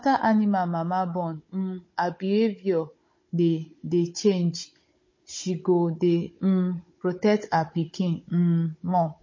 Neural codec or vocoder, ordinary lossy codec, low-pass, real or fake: codec, 16 kHz, 16 kbps, FunCodec, trained on Chinese and English, 50 frames a second; MP3, 32 kbps; 7.2 kHz; fake